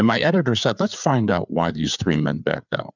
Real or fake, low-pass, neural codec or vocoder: fake; 7.2 kHz; codec, 16 kHz, 4 kbps, FreqCodec, larger model